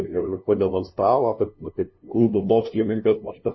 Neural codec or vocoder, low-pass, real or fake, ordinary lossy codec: codec, 16 kHz, 0.5 kbps, FunCodec, trained on LibriTTS, 25 frames a second; 7.2 kHz; fake; MP3, 24 kbps